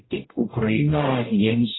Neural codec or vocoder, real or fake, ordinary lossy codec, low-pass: codec, 44.1 kHz, 0.9 kbps, DAC; fake; AAC, 16 kbps; 7.2 kHz